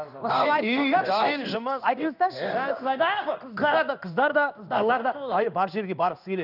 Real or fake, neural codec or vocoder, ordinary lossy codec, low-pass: fake; codec, 16 kHz in and 24 kHz out, 1 kbps, XY-Tokenizer; none; 5.4 kHz